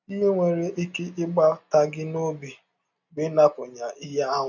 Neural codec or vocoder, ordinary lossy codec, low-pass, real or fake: none; none; 7.2 kHz; real